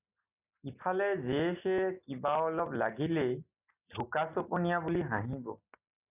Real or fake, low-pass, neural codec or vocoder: real; 3.6 kHz; none